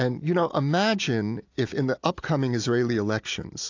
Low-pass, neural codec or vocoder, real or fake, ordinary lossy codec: 7.2 kHz; none; real; AAC, 48 kbps